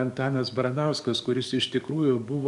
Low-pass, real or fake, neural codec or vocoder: 10.8 kHz; fake; codec, 44.1 kHz, 7.8 kbps, DAC